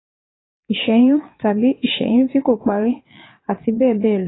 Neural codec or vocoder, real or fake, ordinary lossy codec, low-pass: vocoder, 22.05 kHz, 80 mel bands, WaveNeXt; fake; AAC, 16 kbps; 7.2 kHz